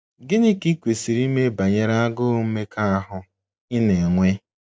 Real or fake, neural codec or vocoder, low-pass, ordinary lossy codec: real; none; none; none